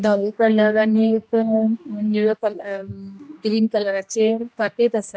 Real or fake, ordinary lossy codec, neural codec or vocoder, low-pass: fake; none; codec, 16 kHz, 1 kbps, X-Codec, HuBERT features, trained on general audio; none